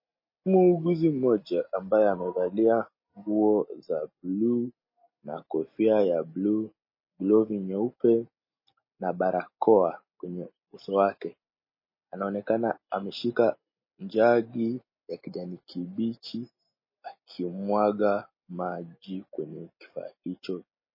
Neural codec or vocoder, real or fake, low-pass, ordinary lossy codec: none; real; 5.4 kHz; MP3, 24 kbps